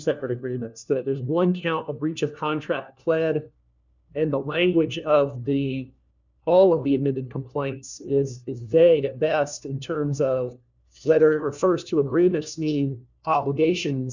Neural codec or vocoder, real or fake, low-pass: codec, 16 kHz, 1 kbps, FunCodec, trained on LibriTTS, 50 frames a second; fake; 7.2 kHz